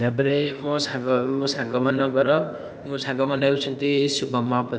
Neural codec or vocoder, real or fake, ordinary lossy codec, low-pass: codec, 16 kHz, 0.8 kbps, ZipCodec; fake; none; none